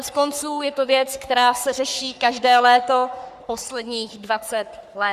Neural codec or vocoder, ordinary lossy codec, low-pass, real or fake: codec, 44.1 kHz, 3.4 kbps, Pupu-Codec; Opus, 64 kbps; 14.4 kHz; fake